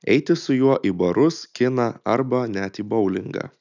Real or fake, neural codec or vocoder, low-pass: real; none; 7.2 kHz